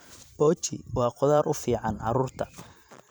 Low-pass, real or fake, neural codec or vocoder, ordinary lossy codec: none; fake; vocoder, 44.1 kHz, 128 mel bands every 512 samples, BigVGAN v2; none